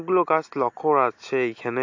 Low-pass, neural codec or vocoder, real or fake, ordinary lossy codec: 7.2 kHz; none; real; AAC, 48 kbps